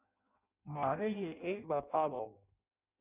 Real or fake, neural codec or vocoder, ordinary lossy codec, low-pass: fake; codec, 16 kHz in and 24 kHz out, 0.6 kbps, FireRedTTS-2 codec; Opus, 64 kbps; 3.6 kHz